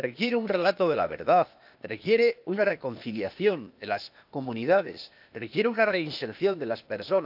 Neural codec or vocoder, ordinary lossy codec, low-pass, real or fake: codec, 16 kHz, 0.8 kbps, ZipCodec; none; 5.4 kHz; fake